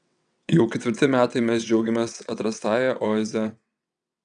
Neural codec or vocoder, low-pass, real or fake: vocoder, 22.05 kHz, 80 mel bands, WaveNeXt; 9.9 kHz; fake